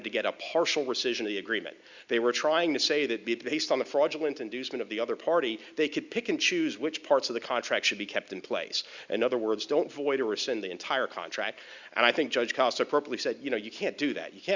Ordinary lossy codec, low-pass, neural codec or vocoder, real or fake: Opus, 64 kbps; 7.2 kHz; none; real